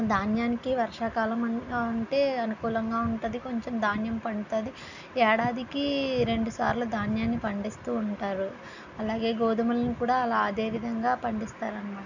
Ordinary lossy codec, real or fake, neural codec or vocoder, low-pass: none; real; none; 7.2 kHz